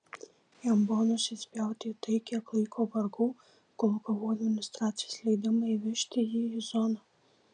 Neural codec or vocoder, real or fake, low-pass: none; real; 9.9 kHz